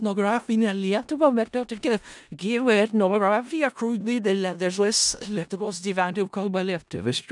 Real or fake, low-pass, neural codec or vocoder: fake; 10.8 kHz; codec, 16 kHz in and 24 kHz out, 0.4 kbps, LongCat-Audio-Codec, four codebook decoder